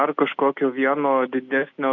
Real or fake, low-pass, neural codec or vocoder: real; 7.2 kHz; none